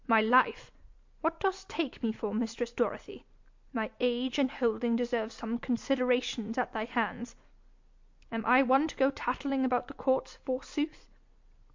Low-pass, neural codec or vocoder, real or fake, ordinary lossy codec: 7.2 kHz; none; real; MP3, 48 kbps